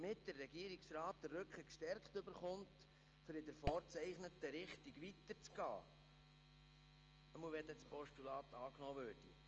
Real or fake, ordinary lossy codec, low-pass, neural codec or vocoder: real; Opus, 24 kbps; 7.2 kHz; none